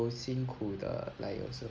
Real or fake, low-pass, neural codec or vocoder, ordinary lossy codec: real; 7.2 kHz; none; Opus, 24 kbps